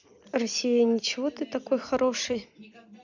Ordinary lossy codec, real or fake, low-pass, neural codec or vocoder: none; fake; 7.2 kHz; vocoder, 44.1 kHz, 128 mel bands every 256 samples, BigVGAN v2